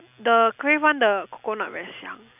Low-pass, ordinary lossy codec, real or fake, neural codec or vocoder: 3.6 kHz; none; real; none